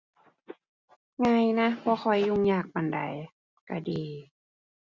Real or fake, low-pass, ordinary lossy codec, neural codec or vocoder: real; 7.2 kHz; Opus, 64 kbps; none